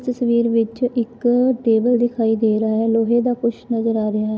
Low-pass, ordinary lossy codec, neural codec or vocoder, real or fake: none; none; none; real